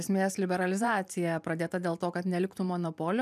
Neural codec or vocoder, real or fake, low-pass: vocoder, 44.1 kHz, 128 mel bands every 512 samples, BigVGAN v2; fake; 14.4 kHz